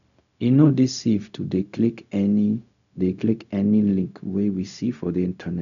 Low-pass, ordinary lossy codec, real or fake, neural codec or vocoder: 7.2 kHz; none; fake; codec, 16 kHz, 0.4 kbps, LongCat-Audio-Codec